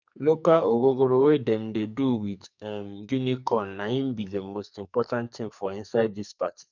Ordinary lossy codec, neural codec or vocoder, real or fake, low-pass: none; codec, 44.1 kHz, 2.6 kbps, SNAC; fake; 7.2 kHz